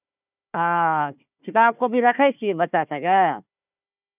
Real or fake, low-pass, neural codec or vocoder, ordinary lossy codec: fake; 3.6 kHz; codec, 16 kHz, 1 kbps, FunCodec, trained on Chinese and English, 50 frames a second; none